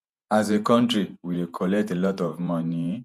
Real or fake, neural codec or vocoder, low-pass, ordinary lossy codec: fake; vocoder, 48 kHz, 128 mel bands, Vocos; 14.4 kHz; none